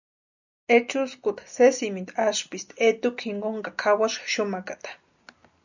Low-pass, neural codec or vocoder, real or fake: 7.2 kHz; none; real